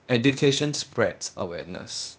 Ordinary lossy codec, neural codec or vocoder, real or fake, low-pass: none; codec, 16 kHz, 0.8 kbps, ZipCodec; fake; none